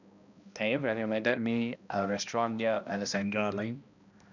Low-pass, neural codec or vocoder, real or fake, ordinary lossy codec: 7.2 kHz; codec, 16 kHz, 1 kbps, X-Codec, HuBERT features, trained on balanced general audio; fake; none